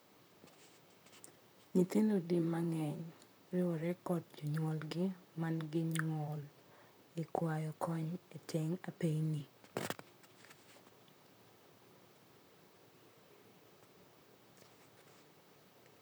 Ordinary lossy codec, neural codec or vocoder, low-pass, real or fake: none; vocoder, 44.1 kHz, 128 mel bands, Pupu-Vocoder; none; fake